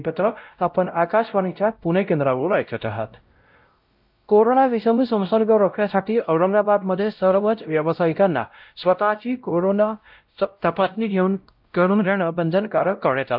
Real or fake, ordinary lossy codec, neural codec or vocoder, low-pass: fake; Opus, 24 kbps; codec, 16 kHz, 0.5 kbps, X-Codec, WavLM features, trained on Multilingual LibriSpeech; 5.4 kHz